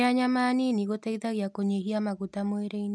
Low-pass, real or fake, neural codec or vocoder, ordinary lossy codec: none; real; none; none